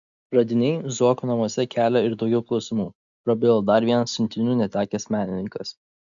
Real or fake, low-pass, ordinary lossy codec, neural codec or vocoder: real; 7.2 kHz; MP3, 96 kbps; none